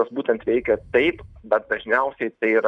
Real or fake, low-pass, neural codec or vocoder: real; 10.8 kHz; none